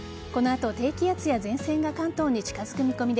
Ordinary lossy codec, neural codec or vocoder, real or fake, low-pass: none; none; real; none